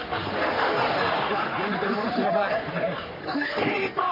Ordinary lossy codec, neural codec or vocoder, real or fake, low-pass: none; codec, 44.1 kHz, 3.4 kbps, Pupu-Codec; fake; 5.4 kHz